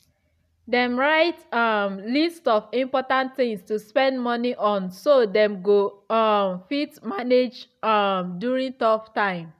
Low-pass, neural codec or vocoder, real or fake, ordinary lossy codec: 14.4 kHz; none; real; none